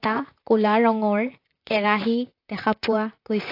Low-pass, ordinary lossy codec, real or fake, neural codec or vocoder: 5.4 kHz; AAC, 32 kbps; fake; vocoder, 44.1 kHz, 128 mel bands, Pupu-Vocoder